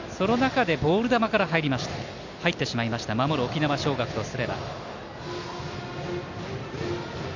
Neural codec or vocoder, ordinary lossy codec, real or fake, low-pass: none; none; real; 7.2 kHz